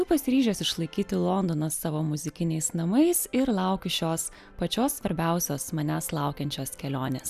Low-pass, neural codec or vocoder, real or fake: 14.4 kHz; none; real